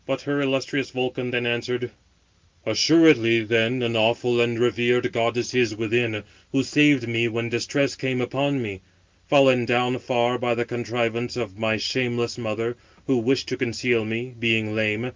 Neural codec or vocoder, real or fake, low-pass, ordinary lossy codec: none; real; 7.2 kHz; Opus, 32 kbps